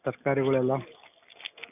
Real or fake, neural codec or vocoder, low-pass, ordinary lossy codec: real; none; 3.6 kHz; none